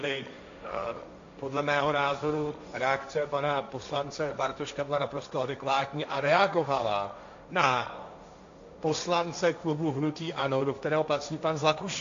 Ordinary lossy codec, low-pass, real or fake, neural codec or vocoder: AAC, 64 kbps; 7.2 kHz; fake; codec, 16 kHz, 1.1 kbps, Voila-Tokenizer